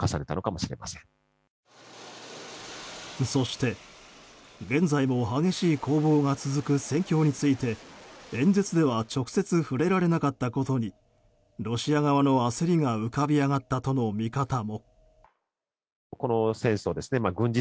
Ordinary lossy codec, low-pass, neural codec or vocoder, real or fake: none; none; none; real